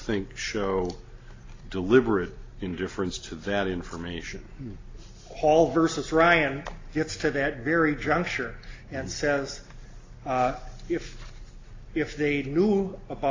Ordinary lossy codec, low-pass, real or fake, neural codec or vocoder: AAC, 32 kbps; 7.2 kHz; real; none